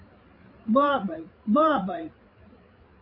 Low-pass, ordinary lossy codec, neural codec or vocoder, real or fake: 5.4 kHz; AAC, 32 kbps; codec, 16 kHz, 8 kbps, FreqCodec, larger model; fake